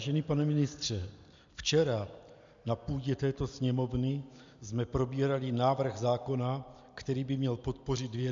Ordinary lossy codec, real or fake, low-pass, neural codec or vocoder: MP3, 64 kbps; real; 7.2 kHz; none